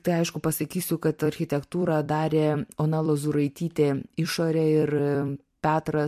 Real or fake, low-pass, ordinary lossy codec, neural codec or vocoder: fake; 14.4 kHz; MP3, 64 kbps; vocoder, 44.1 kHz, 128 mel bands every 256 samples, BigVGAN v2